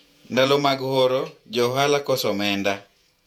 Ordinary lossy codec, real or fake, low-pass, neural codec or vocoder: MP3, 96 kbps; fake; 19.8 kHz; vocoder, 48 kHz, 128 mel bands, Vocos